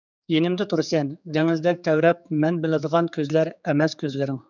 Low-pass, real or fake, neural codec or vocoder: 7.2 kHz; fake; codec, 16 kHz, 4 kbps, X-Codec, HuBERT features, trained on general audio